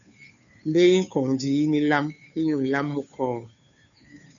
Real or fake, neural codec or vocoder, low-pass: fake; codec, 16 kHz, 2 kbps, FunCodec, trained on Chinese and English, 25 frames a second; 7.2 kHz